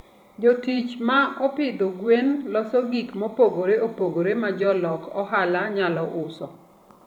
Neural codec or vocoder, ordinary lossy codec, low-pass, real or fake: vocoder, 44.1 kHz, 128 mel bands every 512 samples, BigVGAN v2; none; 19.8 kHz; fake